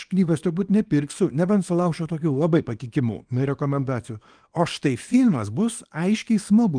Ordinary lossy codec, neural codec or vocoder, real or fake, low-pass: Opus, 32 kbps; codec, 24 kHz, 0.9 kbps, WavTokenizer, small release; fake; 9.9 kHz